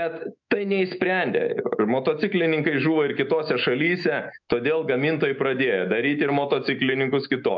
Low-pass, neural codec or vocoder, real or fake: 7.2 kHz; autoencoder, 48 kHz, 128 numbers a frame, DAC-VAE, trained on Japanese speech; fake